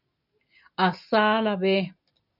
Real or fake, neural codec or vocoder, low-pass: real; none; 5.4 kHz